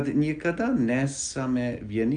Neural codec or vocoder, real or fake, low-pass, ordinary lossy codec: none; real; 9.9 kHz; Opus, 32 kbps